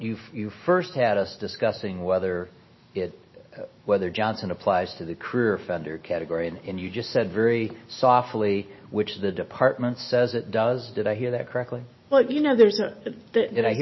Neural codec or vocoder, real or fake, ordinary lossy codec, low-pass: none; real; MP3, 24 kbps; 7.2 kHz